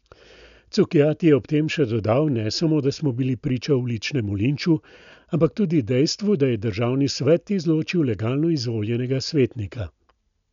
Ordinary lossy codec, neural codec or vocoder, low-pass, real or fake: none; none; 7.2 kHz; real